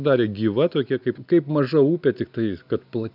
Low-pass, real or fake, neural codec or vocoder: 5.4 kHz; real; none